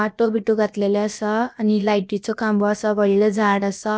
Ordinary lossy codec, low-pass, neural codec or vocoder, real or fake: none; none; codec, 16 kHz, about 1 kbps, DyCAST, with the encoder's durations; fake